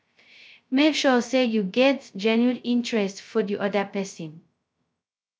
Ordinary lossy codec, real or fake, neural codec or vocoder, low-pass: none; fake; codec, 16 kHz, 0.2 kbps, FocalCodec; none